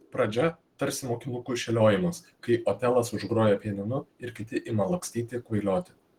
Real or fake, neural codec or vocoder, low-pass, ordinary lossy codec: real; none; 19.8 kHz; Opus, 16 kbps